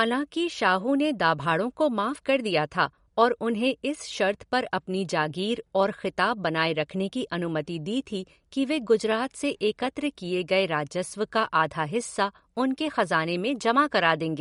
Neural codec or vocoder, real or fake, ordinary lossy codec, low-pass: none; real; MP3, 48 kbps; 10.8 kHz